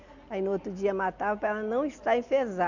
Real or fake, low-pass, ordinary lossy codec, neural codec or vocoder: real; 7.2 kHz; none; none